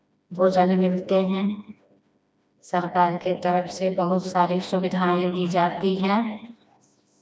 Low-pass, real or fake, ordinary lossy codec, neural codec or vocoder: none; fake; none; codec, 16 kHz, 1 kbps, FreqCodec, smaller model